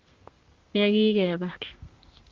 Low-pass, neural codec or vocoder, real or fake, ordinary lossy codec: 7.2 kHz; codec, 32 kHz, 1.9 kbps, SNAC; fake; Opus, 32 kbps